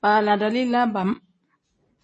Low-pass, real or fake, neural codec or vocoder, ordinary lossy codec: 9.9 kHz; real; none; MP3, 32 kbps